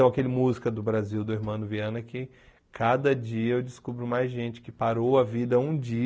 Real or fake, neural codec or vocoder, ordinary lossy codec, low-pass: real; none; none; none